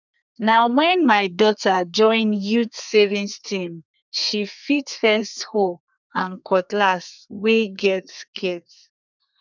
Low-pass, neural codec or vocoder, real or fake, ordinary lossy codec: 7.2 kHz; codec, 32 kHz, 1.9 kbps, SNAC; fake; none